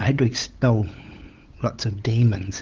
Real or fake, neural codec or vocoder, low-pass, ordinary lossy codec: fake; codec, 16 kHz, 8 kbps, FunCodec, trained on LibriTTS, 25 frames a second; 7.2 kHz; Opus, 16 kbps